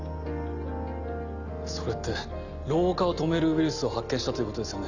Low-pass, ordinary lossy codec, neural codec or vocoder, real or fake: 7.2 kHz; none; none; real